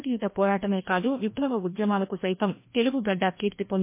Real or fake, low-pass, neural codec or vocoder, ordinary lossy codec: fake; 3.6 kHz; codec, 16 kHz, 1 kbps, FreqCodec, larger model; MP3, 24 kbps